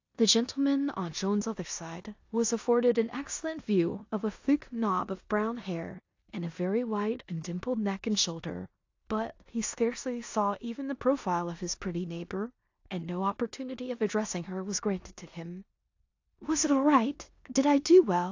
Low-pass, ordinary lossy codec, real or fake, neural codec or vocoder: 7.2 kHz; AAC, 48 kbps; fake; codec, 16 kHz in and 24 kHz out, 0.9 kbps, LongCat-Audio-Codec, four codebook decoder